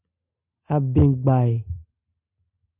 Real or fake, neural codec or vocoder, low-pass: real; none; 3.6 kHz